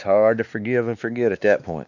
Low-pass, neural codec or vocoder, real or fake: 7.2 kHz; codec, 16 kHz, 2 kbps, X-Codec, WavLM features, trained on Multilingual LibriSpeech; fake